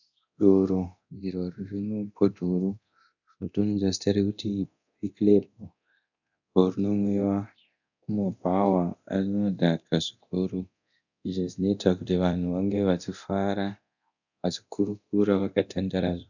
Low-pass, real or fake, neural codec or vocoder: 7.2 kHz; fake; codec, 24 kHz, 0.9 kbps, DualCodec